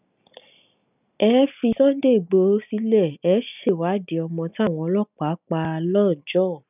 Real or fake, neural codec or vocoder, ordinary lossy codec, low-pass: real; none; none; 3.6 kHz